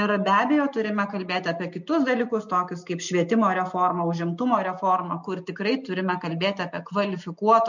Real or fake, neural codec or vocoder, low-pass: real; none; 7.2 kHz